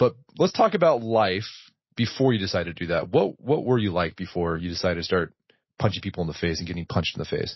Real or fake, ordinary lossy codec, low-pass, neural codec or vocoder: real; MP3, 24 kbps; 7.2 kHz; none